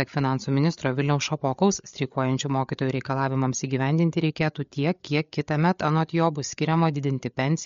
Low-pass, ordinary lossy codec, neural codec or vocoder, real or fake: 7.2 kHz; MP3, 48 kbps; codec, 16 kHz, 8 kbps, FreqCodec, larger model; fake